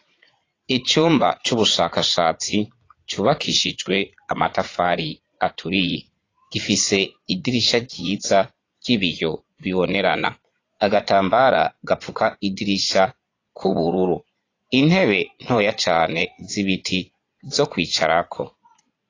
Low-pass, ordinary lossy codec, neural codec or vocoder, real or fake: 7.2 kHz; AAC, 32 kbps; vocoder, 22.05 kHz, 80 mel bands, Vocos; fake